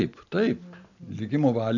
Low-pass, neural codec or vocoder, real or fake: 7.2 kHz; none; real